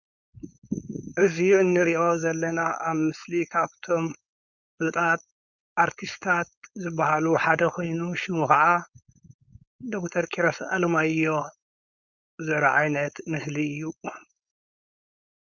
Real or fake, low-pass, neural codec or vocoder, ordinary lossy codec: fake; 7.2 kHz; codec, 16 kHz, 4.8 kbps, FACodec; Opus, 64 kbps